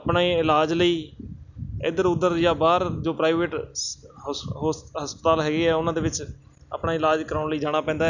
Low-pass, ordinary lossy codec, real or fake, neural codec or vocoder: 7.2 kHz; none; real; none